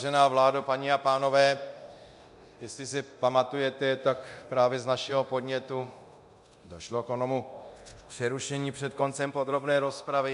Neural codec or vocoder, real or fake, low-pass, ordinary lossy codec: codec, 24 kHz, 0.9 kbps, DualCodec; fake; 10.8 kHz; AAC, 96 kbps